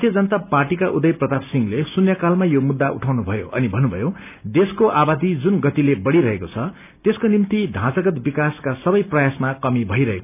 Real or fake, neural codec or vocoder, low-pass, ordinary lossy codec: real; none; 3.6 kHz; none